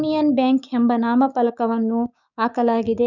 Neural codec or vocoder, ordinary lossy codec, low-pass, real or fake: codec, 16 kHz, 6 kbps, DAC; none; 7.2 kHz; fake